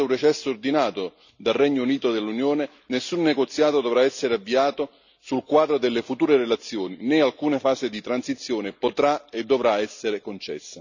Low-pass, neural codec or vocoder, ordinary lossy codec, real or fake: 7.2 kHz; none; none; real